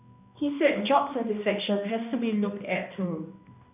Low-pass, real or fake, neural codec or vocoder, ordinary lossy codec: 3.6 kHz; fake; codec, 16 kHz, 1 kbps, X-Codec, HuBERT features, trained on balanced general audio; none